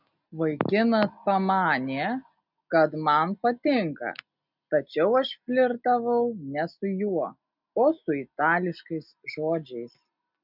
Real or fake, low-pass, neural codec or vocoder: real; 5.4 kHz; none